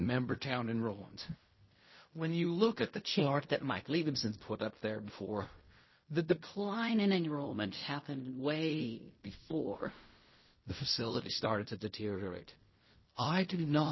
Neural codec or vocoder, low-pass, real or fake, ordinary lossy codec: codec, 16 kHz in and 24 kHz out, 0.4 kbps, LongCat-Audio-Codec, fine tuned four codebook decoder; 7.2 kHz; fake; MP3, 24 kbps